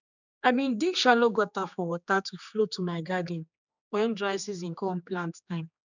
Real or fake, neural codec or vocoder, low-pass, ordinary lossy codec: fake; codec, 16 kHz, 2 kbps, X-Codec, HuBERT features, trained on general audio; 7.2 kHz; none